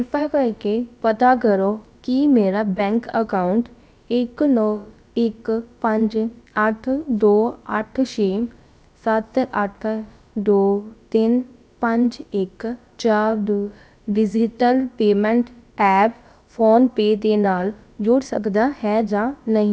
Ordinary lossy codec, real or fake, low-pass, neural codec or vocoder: none; fake; none; codec, 16 kHz, about 1 kbps, DyCAST, with the encoder's durations